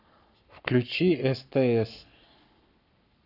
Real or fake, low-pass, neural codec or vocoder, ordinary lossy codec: fake; 5.4 kHz; codec, 44.1 kHz, 7.8 kbps, Pupu-Codec; AAC, 48 kbps